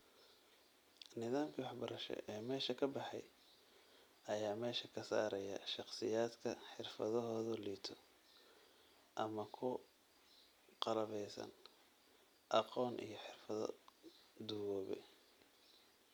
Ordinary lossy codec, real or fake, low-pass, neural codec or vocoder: none; real; none; none